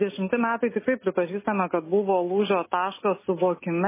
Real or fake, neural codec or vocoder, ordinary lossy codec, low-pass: real; none; MP3, 16 kbps; 3.6 kHz